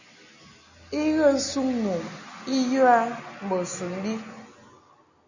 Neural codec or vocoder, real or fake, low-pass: none; real; 7.2 kHz